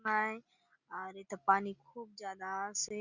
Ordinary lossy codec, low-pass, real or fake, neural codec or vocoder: Opus, 64 kbps; 7.2 kHz; real; none